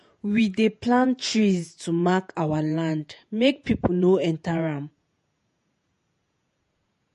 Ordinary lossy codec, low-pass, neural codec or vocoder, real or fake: MP3, 48 kbps; 14.4 kHz; vocoder, 48 kHz, 128 mel bands, Vocos; fake